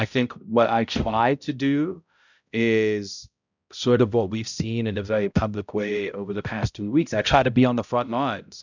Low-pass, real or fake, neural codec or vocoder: 7.2 kHz; fake; codec, 16 kHz, 0.5 kbps, X-Codec, HuBERT features, trained on balanced general audio